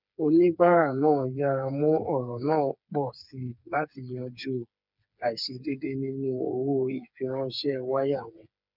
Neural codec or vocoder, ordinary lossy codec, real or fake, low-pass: codec, 16 kHz, 4 kbps, FreqCodec, smaller model; none; fake; 5.4 kHz